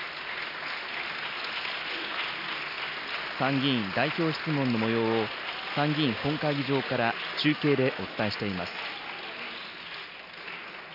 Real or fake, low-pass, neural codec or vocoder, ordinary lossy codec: real; 5.4 kHz; none; none